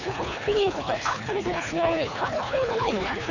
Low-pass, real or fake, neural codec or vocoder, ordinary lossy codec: 7.2 kHz; fake; codec, 24 kHz, 3 kbps, HILCodec; none